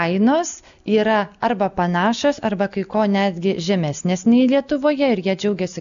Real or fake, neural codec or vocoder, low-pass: real; none; 7.2 kHz